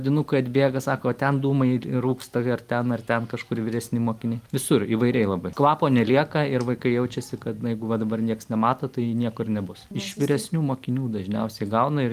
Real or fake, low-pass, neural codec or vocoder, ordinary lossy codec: real; 14.4 kHz; none; Opus, 32 kbps